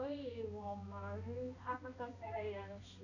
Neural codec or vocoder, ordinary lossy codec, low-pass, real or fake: codec, 16 kHz, 1 kbps, X-Codec, HuBERT features, trained on balanced general audio; none; 7.2 kHz; fake